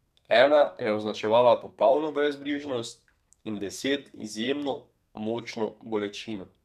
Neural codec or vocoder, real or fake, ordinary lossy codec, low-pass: codec, 32 kHz, 1.9 kbps, SNAC; fake; none; 14.4 kHz